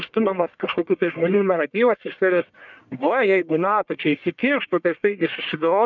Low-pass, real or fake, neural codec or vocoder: 7.2 kHz; fake; codec, 44.1 kHz, 1.7 kbps, Pupu-Codec